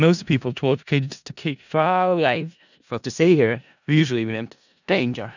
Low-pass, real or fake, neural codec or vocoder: 7.2 kHz; fake; codec, 16 kHz in and 24 kHz out, 0.4 kbps, LongCat-Audio-Codec, four codebook decoder